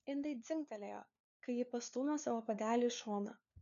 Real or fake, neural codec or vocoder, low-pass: fake; codec, 16 kHz, 4 kbps, FunCodec, trained on LibriTTS, 50 frames a second; 7.2 kHz